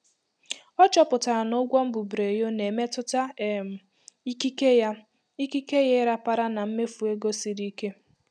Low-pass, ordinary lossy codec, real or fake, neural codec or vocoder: none; none; real; none